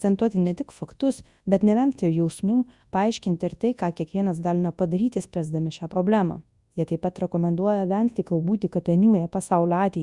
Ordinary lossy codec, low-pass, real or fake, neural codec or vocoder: Opus, 64 kbps; 10.8 kHz; fake; codec, 24 kHz, 0.9 kbps, WavTokenizer, large speech release